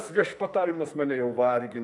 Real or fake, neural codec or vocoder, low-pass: fake; codec, 44.1 kHz, 2.6 kbps, SNAC; 10.8 kHz